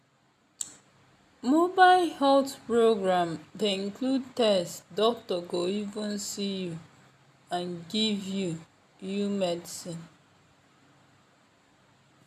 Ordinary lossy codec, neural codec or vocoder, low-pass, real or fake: none; none; 14.4 kHz; real